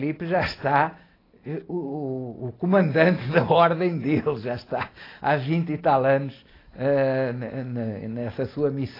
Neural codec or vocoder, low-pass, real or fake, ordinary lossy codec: none; 5.4 kHz; real; AAC, 24 kbps